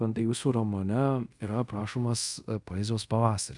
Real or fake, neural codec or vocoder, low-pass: fake; codec, 24 kHz, 0.5 kbps, DualCodec; 10.8 kHz